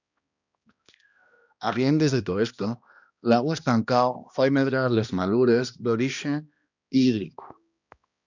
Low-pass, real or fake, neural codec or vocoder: 7.2 kHz; fake; codec, 16 kHz, 1 kbps, X-Codec, HuBERT features, trained on balanced general audio